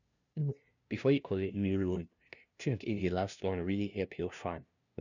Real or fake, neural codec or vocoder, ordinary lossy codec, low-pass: fake; codec, 16 kHz, 0.5 kbps, FunCodec, trained on LibriTTS, 25 frames a second; none; 7.2 kHz